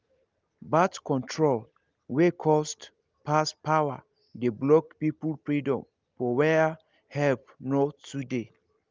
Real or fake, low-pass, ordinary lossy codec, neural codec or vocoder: real; 7.2 kHz; Opus, 32 kbps; none